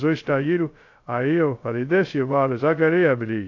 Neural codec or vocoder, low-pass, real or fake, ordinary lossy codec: codec, 16 kHz, 0.2 kbps, FocalCodec; 7.2 kHz; fake; AAC, 48 kbps